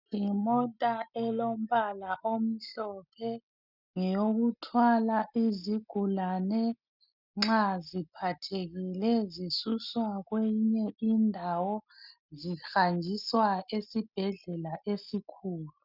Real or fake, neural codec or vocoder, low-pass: real; none; 5.4 kHz